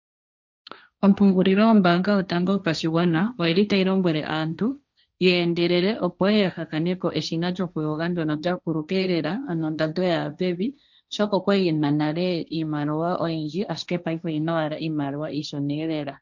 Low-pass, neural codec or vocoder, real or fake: 7.2 kHz; codec, 16 kHz, 1.1 kbps, Voila-Tokenizer; fake